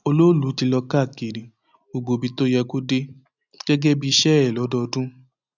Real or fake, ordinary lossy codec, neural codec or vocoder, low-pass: real; none; none; 7.2 kHz